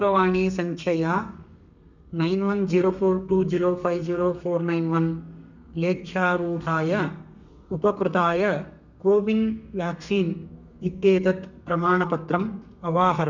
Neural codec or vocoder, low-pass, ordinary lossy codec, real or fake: codec, 32 kHz, 1.9 kbps, SNAC; 7.2 kHz; none; fake